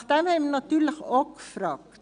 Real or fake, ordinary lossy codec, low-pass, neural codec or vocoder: real; none; 9.9 kHz; none